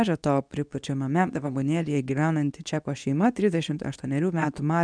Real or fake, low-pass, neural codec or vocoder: fake; 9.9 kHz; codec, 24 kHz, 0.9 kbps, WavTokenizer, medium speech release version 2